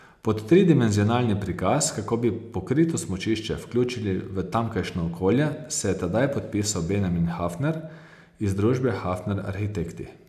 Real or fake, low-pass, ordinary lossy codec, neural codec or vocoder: real; 14.4 kHz; none; none